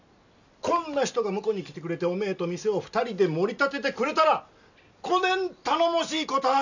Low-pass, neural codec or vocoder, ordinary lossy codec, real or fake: 7.2 kHz; none; none; real